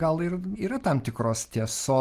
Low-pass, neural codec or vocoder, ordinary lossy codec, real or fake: 14.4 kHz; none; Opus, 24 kbps; real